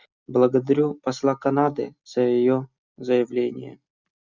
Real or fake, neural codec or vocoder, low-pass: real; none; 7.2 kHz